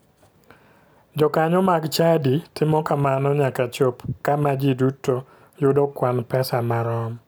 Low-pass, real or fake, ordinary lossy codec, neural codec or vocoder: none; real; none; none